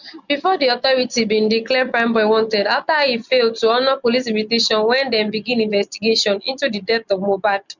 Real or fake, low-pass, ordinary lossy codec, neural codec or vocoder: real; 7.2 kHz; none; none